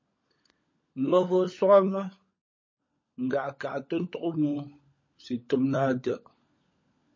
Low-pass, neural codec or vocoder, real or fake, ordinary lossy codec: 7.2 kHz; codec, 16 kHz, 16 kbps, FunCodec, trained on LibriTTS, 50 frames a second; fake; MP3, 32 kbps